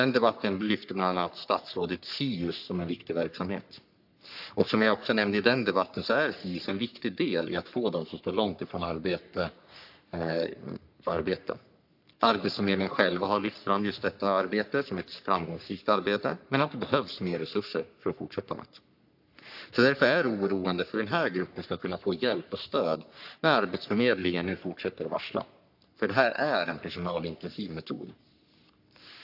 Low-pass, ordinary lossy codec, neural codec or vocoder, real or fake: 5.4 kHz; AAC, 48 kbps; codec, 44.1 kHz, 3.4 kbps, Pupu-Codec; fake